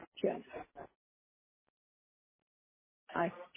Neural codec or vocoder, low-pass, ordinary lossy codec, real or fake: none; 3.6 kHz; MP3, 16 kbps; real